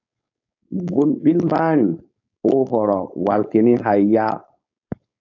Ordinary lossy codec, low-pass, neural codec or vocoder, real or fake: AAC, 48 kbps; 7.2 kHz; codec, 16 kHz, 4.8 kbps, FACodec; fake